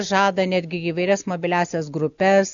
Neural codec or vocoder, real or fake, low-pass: none; real; 7.2 kHz